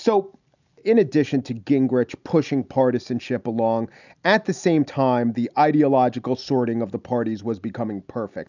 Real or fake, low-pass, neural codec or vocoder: real; 7.2 kHz; none